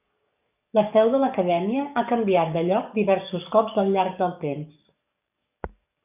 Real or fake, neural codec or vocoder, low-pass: fake; codec, 44.1 kHz, 7.8 kbps, DAC; 3.6 kHz